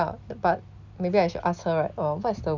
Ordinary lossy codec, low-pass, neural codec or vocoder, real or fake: none; 7.2 kHz; none; real